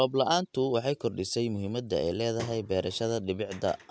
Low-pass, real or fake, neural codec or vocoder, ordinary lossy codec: none; real; none; none